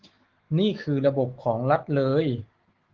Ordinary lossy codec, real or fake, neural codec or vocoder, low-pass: Opus, 16 kbps; fake; autoencoder, 48 kHz, 128 numbers a frame, DAC-VAE, trained on Japanese speech; 7.2 kHz